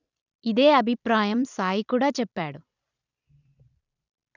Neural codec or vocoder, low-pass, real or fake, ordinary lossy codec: vocoder, 44.1 kHz, 128 mel bands every 256 samples, BigVGAN v2; 7.2 kHz; fake; none